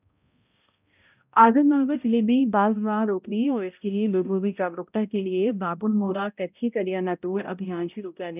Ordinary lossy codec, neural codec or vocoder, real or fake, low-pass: none; codec, 16 kHz, 0.5 kbps, X-Codec, HuBERT features, trained on balanced general audio; fake; 3.6 kHz